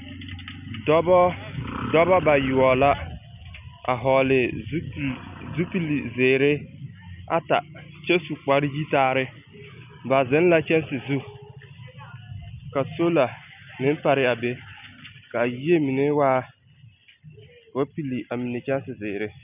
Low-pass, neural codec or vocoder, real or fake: 3.6 kHz; none; real